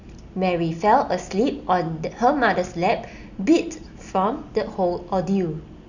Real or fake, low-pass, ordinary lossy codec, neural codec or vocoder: real; 7.2 kHz; none; none